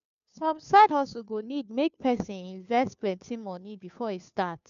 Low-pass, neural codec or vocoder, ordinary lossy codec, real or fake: 7.2 kHz; codec, 16 kHz, 2 kbps, FunCodec, trained on Chinese and English, 25 frames a second; none; fake